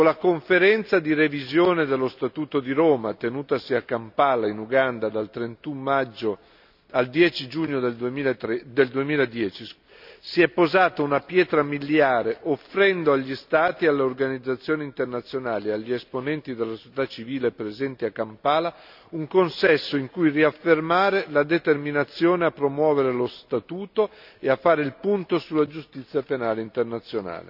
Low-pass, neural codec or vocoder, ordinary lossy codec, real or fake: 5.4 kHz; none; none; real